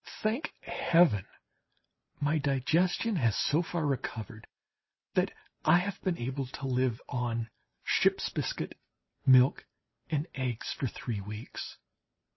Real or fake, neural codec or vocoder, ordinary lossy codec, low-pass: real; none; MP3, 24 kbps; 7.2 kHz